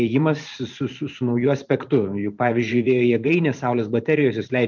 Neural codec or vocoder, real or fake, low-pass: vocoder, 44.1 kHz, 128 mel bands every 512 samples, BigVGAN v2; fake; 7.2 kHz